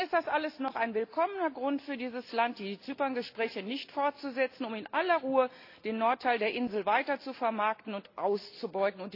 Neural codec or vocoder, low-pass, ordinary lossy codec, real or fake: none; 5.4 kHz; AAC, 32 kbps; real